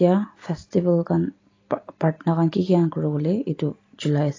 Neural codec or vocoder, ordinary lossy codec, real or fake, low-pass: none; AAC, 32 kbps; real; 7.2 kHz